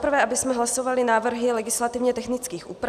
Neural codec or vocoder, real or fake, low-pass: none; real; 14.4 kHz